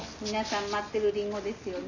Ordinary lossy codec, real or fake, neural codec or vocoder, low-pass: none; real; none; 7.2 kHz